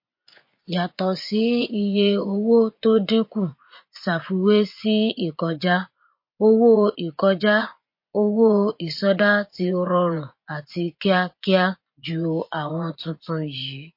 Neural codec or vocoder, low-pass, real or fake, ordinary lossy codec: vocoder, 24 kHz, 100 mel bands, Vocos; 5.4 kHz; fake; MP3, 32 kbps